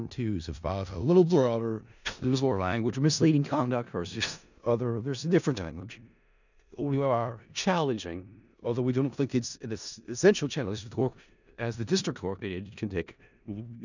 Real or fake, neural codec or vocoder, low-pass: fake; codec, 16 kHz in and 24 kHz out, 0.4 kbps, LongCat-Audio-Codec, four codebook decoder; 7.2 kHz